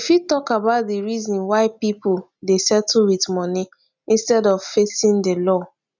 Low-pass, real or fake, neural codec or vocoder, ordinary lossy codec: 7.2 kHz; real; none; none